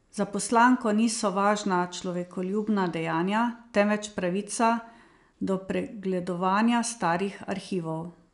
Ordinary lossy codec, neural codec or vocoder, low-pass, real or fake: none; none; 10.8 kHz; real